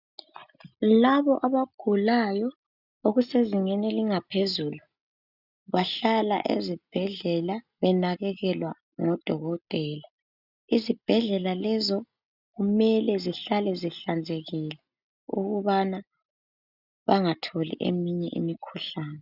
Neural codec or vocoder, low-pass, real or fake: none; 5.4 kHz; real